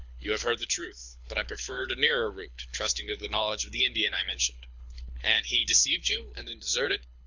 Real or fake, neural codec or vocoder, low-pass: fake; codec, 24 kHz, 6 kbps, HILCodec; 7.2 kHz